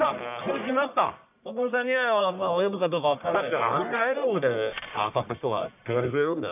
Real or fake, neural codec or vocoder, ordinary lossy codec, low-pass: fake; codec, 44.1 kHz, 1.7 kbps, Pupu-Codec; none; 3.6 kHz